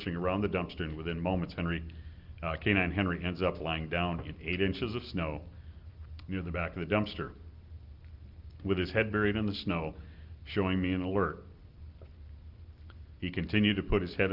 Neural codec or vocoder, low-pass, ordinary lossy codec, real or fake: none; 5.4 kHz; Opus, 24 kbps; real